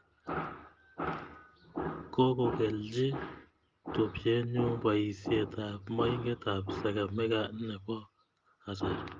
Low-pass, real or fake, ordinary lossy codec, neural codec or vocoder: 7.2 kHz; real; Opus, 32 kbps; none